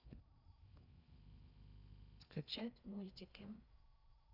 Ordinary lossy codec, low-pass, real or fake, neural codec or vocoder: none; 5.4 kHz; fake; codec, 16 kHz in and 24 kHz out, 0.6 kbps, FocalCodec, streaming, 4096 codes